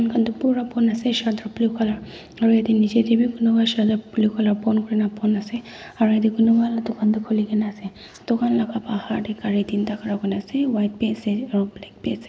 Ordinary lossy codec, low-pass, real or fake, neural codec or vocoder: none; none; real; none